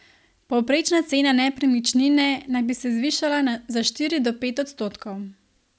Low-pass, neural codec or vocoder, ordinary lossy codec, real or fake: none; none; none; real